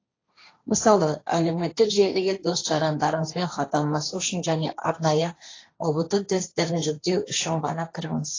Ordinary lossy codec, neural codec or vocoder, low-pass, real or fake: AAC, 32 kbps; codec, 16 kHz, 1.1 kbps, Voila-Tokenizer; 7.2 kHz; fake